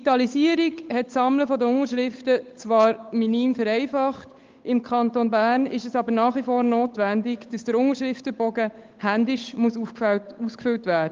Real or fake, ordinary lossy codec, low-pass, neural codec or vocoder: fake; Opus, 32 kbps; 7.2 kHz; codec, 16 kHz, 8 kbps, FunCodec, trained on Chinese and English, 25 frames a second